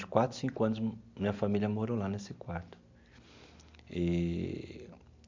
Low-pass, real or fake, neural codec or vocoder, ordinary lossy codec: 7.2 kHz; real; none; MP3, 64 kbps